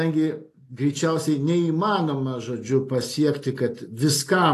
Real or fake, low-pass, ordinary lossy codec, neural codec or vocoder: real; 14.4 kHz; AAC, 48 kbps; none